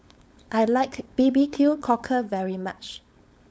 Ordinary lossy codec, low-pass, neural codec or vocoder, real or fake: none; none; codec, 16 kHz, 4.8 kbps, FACodec; fake